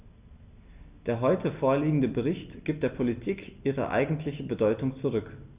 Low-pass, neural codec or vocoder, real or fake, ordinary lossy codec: 3.6 kHz; none; real; Opus, 64 kbps